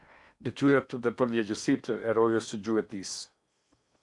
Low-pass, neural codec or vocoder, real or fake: 10.8 kHz; codec, 16 kHz in and 24 kHz out, 0.8 kbps, FocalCodec, streaming, 65536 codes; fake